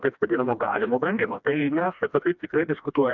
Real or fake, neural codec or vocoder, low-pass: fake; codec, 16 kHz, 1 kbps, FreqCodec, smaller model; 7.2 kHz